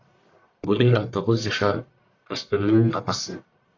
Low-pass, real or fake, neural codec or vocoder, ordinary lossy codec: 7.2 kHz; fake; codec, 44.1 kHz, 1.7 kbps, Pupu-Codec; AAC, 48 kbps